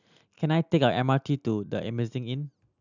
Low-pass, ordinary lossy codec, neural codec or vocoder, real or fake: 7.2 kHz; none; none; real